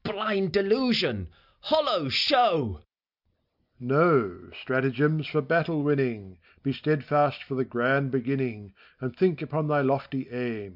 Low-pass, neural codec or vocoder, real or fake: 5.4 kHz; none; real